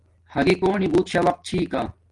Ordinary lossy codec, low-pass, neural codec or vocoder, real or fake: Opus, 24 kbps; 10.8 kHz; none; real